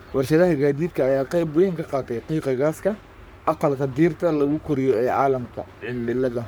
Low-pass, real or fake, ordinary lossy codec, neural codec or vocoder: none; fake; none; codec, 44.1 kHz, 3.4 kbps, Pupu-Codec